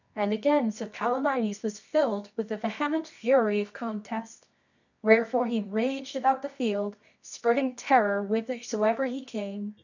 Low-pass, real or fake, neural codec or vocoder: 7.2 kHz; fake; codec, 24 kHz, 0.9 kbps, WavTokenizer, medium music audio release